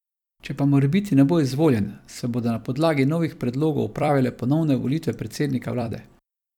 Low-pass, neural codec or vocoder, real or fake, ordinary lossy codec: 19.8 kHz; vocoder, 44.1 kHz, 128 mel bands every 256 samples, BigVGAN v2; fake; none